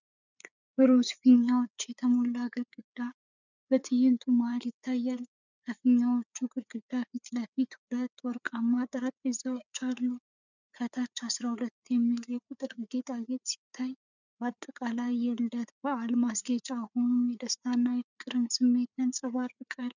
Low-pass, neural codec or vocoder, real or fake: 7.2 kHz; codec, 16 kHz, 4 kbps, FreqCodec, larger model; fake